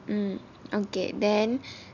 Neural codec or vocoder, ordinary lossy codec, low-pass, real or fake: none; none; 7.2 kHz; real